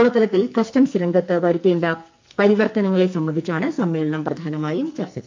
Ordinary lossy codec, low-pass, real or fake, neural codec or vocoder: MP3, 48 kbps; 7.2 kHz; fake; codec, 44.1 kHz, 2.6 kbps, SNAC